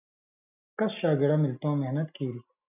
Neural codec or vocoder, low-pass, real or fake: none; 3.6 kHz; real